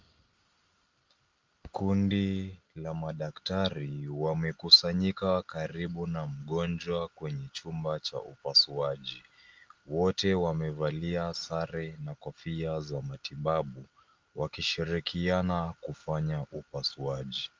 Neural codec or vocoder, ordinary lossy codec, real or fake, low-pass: none; Opus, 32 kbps; real; 7.2 kHz